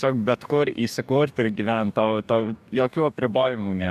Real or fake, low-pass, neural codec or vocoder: fake; 14.4 kHz; codec, 44.1 kHz, 2.6 kbps, DAC